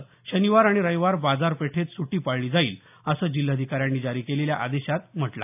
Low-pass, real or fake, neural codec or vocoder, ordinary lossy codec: 3.6 kHz; real; none; AAC, 32 kbps